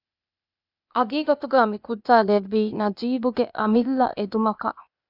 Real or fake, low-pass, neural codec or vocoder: fake; 5.4 kHz; codec, 16 kHz, 0.8 kbps, ZipCodec